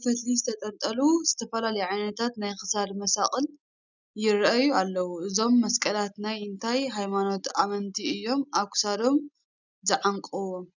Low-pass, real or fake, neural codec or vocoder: 7.2 kHz; real; none